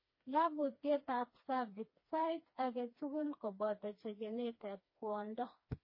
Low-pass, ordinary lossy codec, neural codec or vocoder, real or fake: 5.4 kHz; MP3, 24 kbps; codec, 16 kHz, 2 kbps, FreqCodec, smaller model; fake